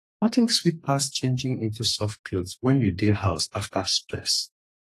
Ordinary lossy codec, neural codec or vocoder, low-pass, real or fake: AAC, 48 kbps; codec, 32 kHz, 1.9 kbps, SNAC; 14.4 kHz; fake